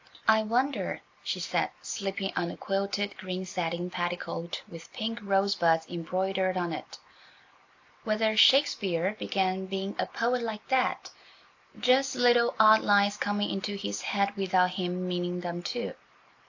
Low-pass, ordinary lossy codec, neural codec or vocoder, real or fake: 7.2 kHz; AAC, 48 kbps; none; real